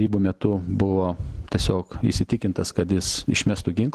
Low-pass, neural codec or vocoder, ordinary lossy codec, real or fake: 14.4 kHz; none; Opus, 16 kbps; real